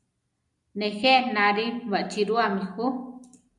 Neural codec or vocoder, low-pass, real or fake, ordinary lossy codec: none; 10.8 kHz; real; MP3, 96 kbps